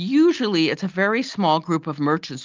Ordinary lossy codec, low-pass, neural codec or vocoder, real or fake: Opus, 24 kbps; 7.2 kHz; none; real